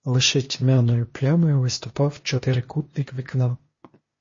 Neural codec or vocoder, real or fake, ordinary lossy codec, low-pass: codec, 16 kHz, 0.8 kbps, ZipCodec; fake; MP3, 32 kbps; 7.2 kHz